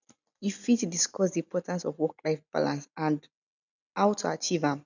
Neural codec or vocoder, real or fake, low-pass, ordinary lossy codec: none; real; 7.2 kHz; none